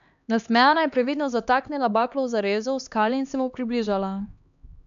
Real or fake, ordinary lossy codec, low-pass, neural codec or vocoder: fake; none; 7.2 kHz; codec, 16 kHz, 2 kbps, X-Codec, HuBERT features, trained on LibriSpeech